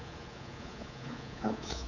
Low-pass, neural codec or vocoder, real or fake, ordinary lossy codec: 7.2 kHz; codec, 24 kHz, 3.1 kbps, DualCodec; fake; none